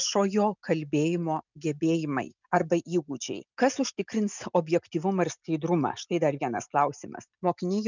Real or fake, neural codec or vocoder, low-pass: real; none; 7.2 kHz